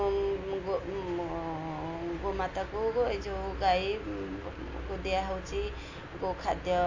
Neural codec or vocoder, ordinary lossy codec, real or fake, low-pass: none; AAC, 48 kbps; real; 7.2 kHz